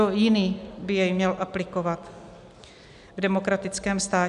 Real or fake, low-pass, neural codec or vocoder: real; 10.8 kHz; none